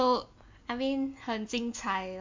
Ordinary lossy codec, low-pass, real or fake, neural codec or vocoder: MP3, 64 kbps; 7.2 kHz; real; none